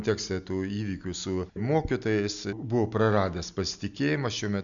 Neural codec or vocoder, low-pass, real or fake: none; 7.2 kHz; real